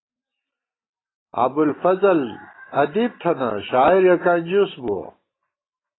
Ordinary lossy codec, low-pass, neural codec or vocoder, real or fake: AAC, 16 kbps; 7.2 kHz; none; real